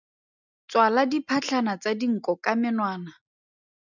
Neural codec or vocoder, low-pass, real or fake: none; 7.2 kHz; real